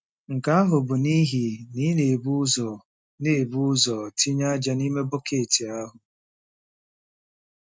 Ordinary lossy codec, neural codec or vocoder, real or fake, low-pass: none; none; real; none